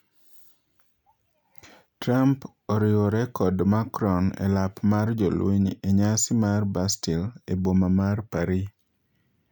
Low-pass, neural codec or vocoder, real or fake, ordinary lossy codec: 19.8 kHz; none; real; none